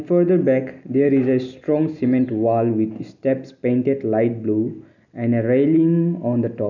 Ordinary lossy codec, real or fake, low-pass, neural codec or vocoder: none; real; 7.2 kHz; none